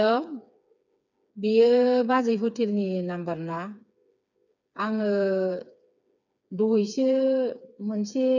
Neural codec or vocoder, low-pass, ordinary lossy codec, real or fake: codec, 16 kHz, 4 kbps, FreqCodec, smaller model; 7.2 kHz; none; fake